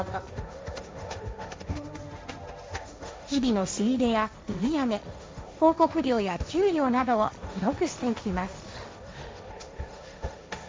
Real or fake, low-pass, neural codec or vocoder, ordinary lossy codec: fake; none; codec, 16 kHz, 1.1 kbps, Voila-Tokenizer; none